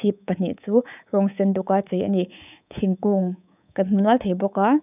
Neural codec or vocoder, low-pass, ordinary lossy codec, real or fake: codec, 24 kHz, 3.1 kbps, DualCodec; 3.6 kHz; none; fake